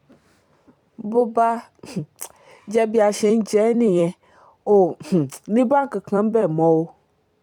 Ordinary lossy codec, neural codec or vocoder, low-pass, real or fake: none; vocoder, 44.1 kHz, 128 mel bands every 256 samples, BigVGAN v2; 19.8 kHz; fake